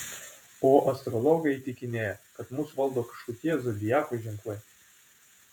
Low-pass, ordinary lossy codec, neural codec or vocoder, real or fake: 19.8 kHz; MP3, 96 kbps; vocoder, 48 kHz, 128 mel bands, Vocos; fake